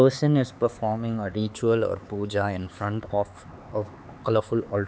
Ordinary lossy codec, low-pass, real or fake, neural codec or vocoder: none; none; fake; codec, 16 kHz, 4 kbps, X-Codec, HuBERT features, trained on LibriSpeech